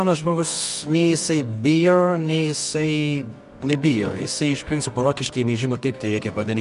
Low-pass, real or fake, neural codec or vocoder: 10.8 kHz; fake; codec, 24 kHz, 0.9 kbps, WavTokenizer, medium music audio release